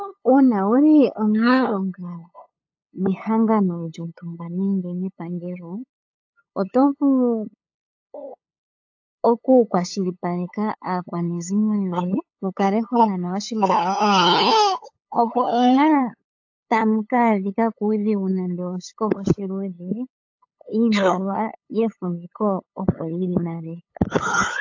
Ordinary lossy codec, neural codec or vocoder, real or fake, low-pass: AAC, 48 kbps; codec, 16 kHz, 8 kbps, FunCodec, trained on LibriTTS, 25 frames a second; fake; 7.2 kHz